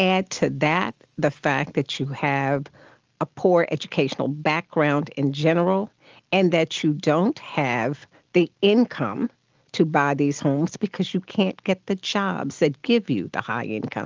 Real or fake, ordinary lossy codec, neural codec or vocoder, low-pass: real; Opus, 32 kbps; none; 7.2 kHz